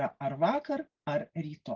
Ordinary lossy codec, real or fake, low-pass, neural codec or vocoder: Opus, 16 kbps; real; 7.2 kHz; none